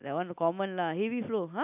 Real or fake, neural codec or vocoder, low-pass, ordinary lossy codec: real; none; 3.6 kHz; none